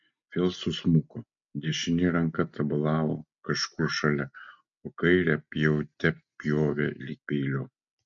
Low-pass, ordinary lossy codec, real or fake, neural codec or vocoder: 7.2 kHz; MP3, 64 kbps; real; none